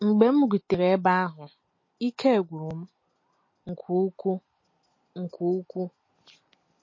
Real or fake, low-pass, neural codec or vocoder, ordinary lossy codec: real; 7.2 kHz; none; MP3, 32 kbps